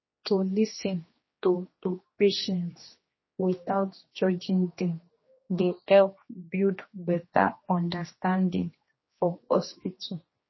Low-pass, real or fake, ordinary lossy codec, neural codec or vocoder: 7.2 kHz; fake; MP3, 24 kbps; codec, 16 kHz, 2 kbps, X-Codec, HuBERT features, trained on general audio